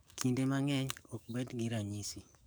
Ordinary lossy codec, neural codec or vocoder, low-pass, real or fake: none; codec, 44.1 kHz, 7.8 kbps, DAC; none; fake